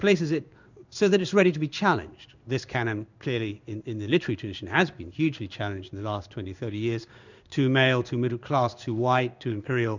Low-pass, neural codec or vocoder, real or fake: 7.2 kHz; codec, 16 kHz in and 24 kHz out, 1 kbps, XY-Tokenizer; fake